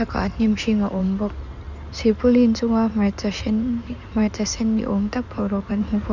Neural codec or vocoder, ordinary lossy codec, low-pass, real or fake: codec, 16 kHz in and 24 kHz out, 2.2 kbps, FireRedTTS-2 codec; none; 7.2 kHz; fake